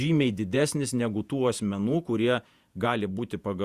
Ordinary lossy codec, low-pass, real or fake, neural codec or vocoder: Opus, 64 kbps; 14.4 kHz; fake; vocoder, 48 kHz, 128 mel bands, Vocos